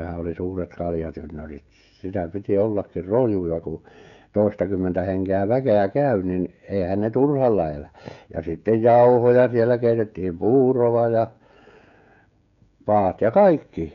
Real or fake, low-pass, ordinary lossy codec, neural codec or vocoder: fake; 7.2 kHz; none; codec, 16 kHz, 16 kbps, FreqCodec, smaller model